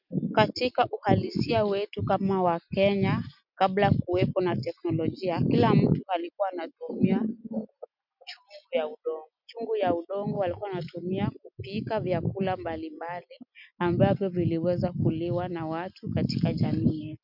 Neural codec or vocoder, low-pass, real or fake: none; 5.4 kHz; real